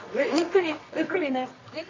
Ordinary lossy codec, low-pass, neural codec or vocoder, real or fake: MP3, 32 kbps; 7.2 kHz; codec, 24 kHz, 0.9 kbps, WavTokenizer, medium music audio release; fake